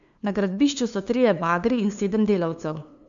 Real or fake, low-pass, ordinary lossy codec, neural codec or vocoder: fake; 7.2 kHz; MP3, 64 kbps; codec, 16 kHz, 2 kbps, FunCodec, trained on LibriTTS, 25 frames a second